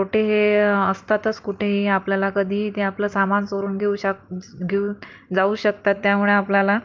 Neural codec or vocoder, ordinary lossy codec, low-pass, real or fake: none; Opus, 32 kbps; 7.2 kHz; real